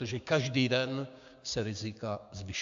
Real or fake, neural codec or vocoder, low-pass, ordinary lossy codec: fake; codec, 16 kHz, 6 kbps, DAC; 7.2 kHz; AAC, 64 kbps